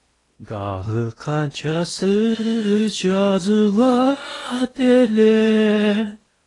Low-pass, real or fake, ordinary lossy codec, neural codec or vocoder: 10.8 kHz; fake; AAC, 32 kbps; codec, 16 kHz in and 24 kHz out, 0.8 kbps, FocalCodec, streaming, 65536 codes